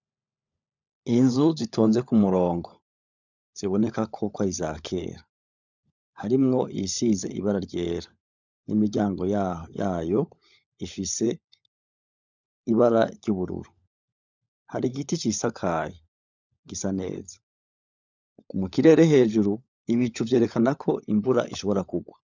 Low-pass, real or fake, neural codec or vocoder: 7.2 kHz; fake; codec, 16 kHz, 16 kbps, FunCodec, trained on LibriTTS, 50 frames a second